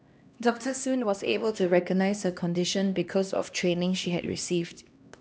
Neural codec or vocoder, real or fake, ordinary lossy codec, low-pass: codec, 16 kHz, 1 kbps, X-Codec, HuBERT features, trained on LibriSpeech; fake; none; none